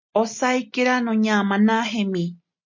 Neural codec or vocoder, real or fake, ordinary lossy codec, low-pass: none; real; MP3, 48 kbps; 7.2 kHz